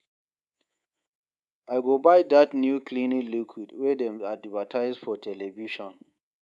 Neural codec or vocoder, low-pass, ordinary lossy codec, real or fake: codec, 24 kHz, 3.1 kbps, DualCodec; none; none; fake